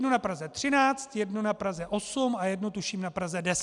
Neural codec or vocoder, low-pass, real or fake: none; 10.8 kHz; real